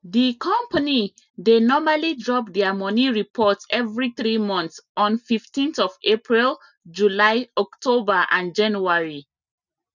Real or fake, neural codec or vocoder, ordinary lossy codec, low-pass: real; none; none; 7.2 kHz